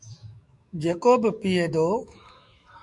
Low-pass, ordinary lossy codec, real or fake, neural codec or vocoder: 10.8 kHz; MP3, 96 kbps; fake; autoencoder, 48 kHz, 128 numbers a frame, DAC-VAE, trained on Japanese speech